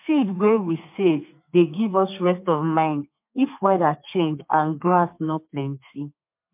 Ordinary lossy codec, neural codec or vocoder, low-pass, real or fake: MP3, 32 kbps; codec, 32 kHz, 1.9 kbps, SNAC; 3.6 kHz; fake